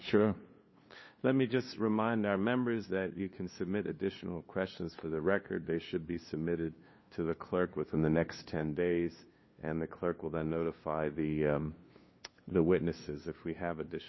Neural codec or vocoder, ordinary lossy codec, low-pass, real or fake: codec, 16 kHz, 2 kbps, FunCodec, trained on LibriTTS, 25 frames a second; MP3, 24 kbps; 7.2 kHz; fake